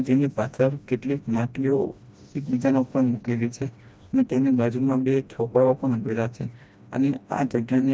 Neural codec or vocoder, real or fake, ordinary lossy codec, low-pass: codec, 16 kHz, 1 kbps, FreqCodec, smaller model; fake; none; none